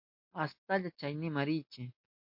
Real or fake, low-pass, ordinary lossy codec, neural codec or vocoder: real; 5.4 kHz; MP3, 32 kbps; none